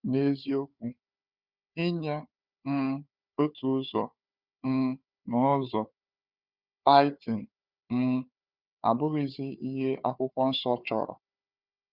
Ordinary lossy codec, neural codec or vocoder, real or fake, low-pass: Opus, 64 kbps; codec, 16 kHz, 4 kbps, FunCodec, trained on Chinese and English, 50 frames a second; fake; 5.4 kHz